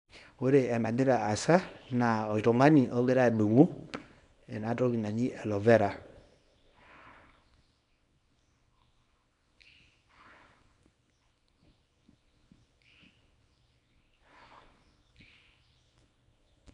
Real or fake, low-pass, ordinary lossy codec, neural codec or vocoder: fake; 10.8 kHz; MP3, 96 kbps; codec, 24 kHz, 0.9 kbps, WavTokenizer, small release